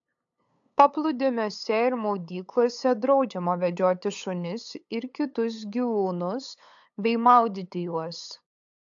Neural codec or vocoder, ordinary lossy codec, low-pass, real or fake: codec, 16 kHz, 8 kbps, FunCodec, trained on LibriTTS, 25 frames a second; AAC, 64 kbps; 7.2 kHz; fake